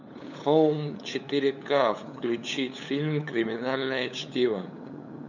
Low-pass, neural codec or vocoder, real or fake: 7.2 kHz; codec, 16 kHz, 8 kbps, FunCodec, trained on LibriTTS, 25 frames a second; fake